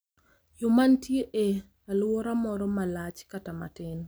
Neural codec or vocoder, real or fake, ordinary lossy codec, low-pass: none; real; none; none